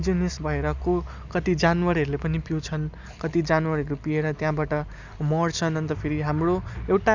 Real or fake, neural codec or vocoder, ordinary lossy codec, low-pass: real; none; none; 7.2 kHz